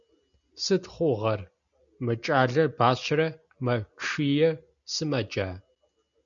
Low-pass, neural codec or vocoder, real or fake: 7.2 kHz; none; real